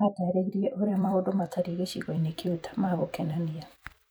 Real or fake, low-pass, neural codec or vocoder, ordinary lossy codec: fake; none; vocoder, 44.1 kHz, 128 mel bands every 256 samples, BigVGAN v2; none